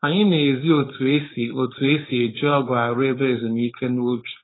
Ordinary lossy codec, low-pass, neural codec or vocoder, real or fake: AAC, 16 kbps; 7.2 kHz; codec, 16 kHz, 4.8 kbps, FACodec; fake